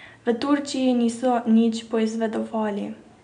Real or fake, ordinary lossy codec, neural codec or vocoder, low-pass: real; none; none; 9.9 kHz